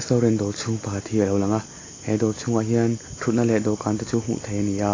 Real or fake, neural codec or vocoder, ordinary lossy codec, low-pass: real; none; AAC, 32 kbps; 7.2 kHz